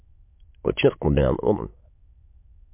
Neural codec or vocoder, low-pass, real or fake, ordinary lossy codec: autoencoder, 22.05 kHz, a latent of 192 numbers a frame, VITS, trained on many speakers; 3.6 kHz; fake; MP3, 32 kbps